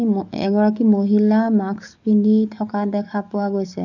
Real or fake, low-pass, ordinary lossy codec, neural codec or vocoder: fake; 7.2 kHz; none; codec, 44.1 kHz, 7.8 kbps, DAC